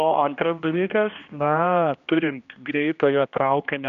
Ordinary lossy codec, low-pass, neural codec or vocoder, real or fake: MP3, 96 kbps; 7.2 kHz; codec, 16 kHz, 1 kbps, X-Codec, HuBERT features, trained on general audio; fake